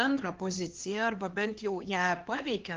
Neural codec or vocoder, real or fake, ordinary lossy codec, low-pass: codec, 16 kHz, 2 kbps, X-Codec, HuBERT features, trained on LibriSpeech; fake; Opus, 16 kbps; 7.2 kHz